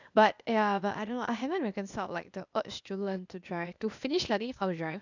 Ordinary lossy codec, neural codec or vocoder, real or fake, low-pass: none; codec, 16 kHz, 0.8 kbps, ZipCodec; fake; 7.2 kHz